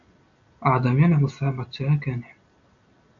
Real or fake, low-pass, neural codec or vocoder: real; 7.2 kHz; none